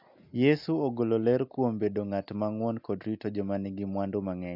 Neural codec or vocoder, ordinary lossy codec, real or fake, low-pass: none; MP3, 48 kbps; real; 5.4 kHz